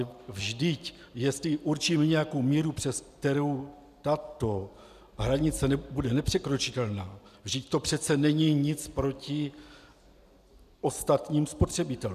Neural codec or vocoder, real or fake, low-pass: none; real; 14.4 kHz